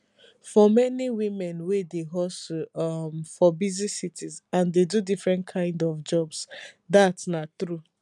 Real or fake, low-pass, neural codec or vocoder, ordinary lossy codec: real; 10.8 kHz; none; none